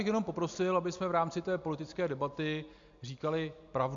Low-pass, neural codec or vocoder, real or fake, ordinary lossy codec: 7.2 kHz; none; real; MP3, 64 kbps